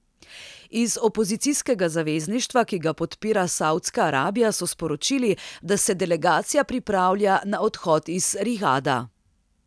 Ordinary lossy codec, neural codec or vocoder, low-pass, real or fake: none; none; none; real